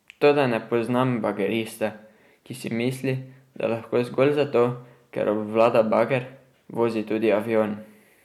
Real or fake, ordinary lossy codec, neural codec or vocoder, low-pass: real; MP3, 96 kbps; none; 19.8 kHz